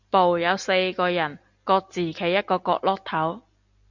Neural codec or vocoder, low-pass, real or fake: none; 7.2 kHz; real